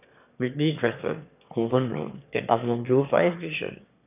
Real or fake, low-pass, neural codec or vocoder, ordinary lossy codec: fake; 3.6 kHz; autoencoder, 22.05 kHz, a latent of 192 numbers a frame, VITS, trained on one speaker; none